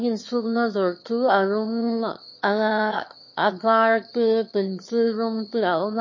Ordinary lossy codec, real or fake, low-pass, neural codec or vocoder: MP3, 32 kbps; fake; 7.2 kHz; autoencoder, 22.05 kHz, a latent of 192 numbers a frame, VITS, trained on one speaker